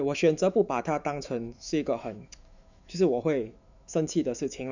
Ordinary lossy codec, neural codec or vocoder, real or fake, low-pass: none; autoencoder, 48 kHz, 128 numbers a frame, DAC-VAE, trained on Japanese speech; fake; 7.2 kHz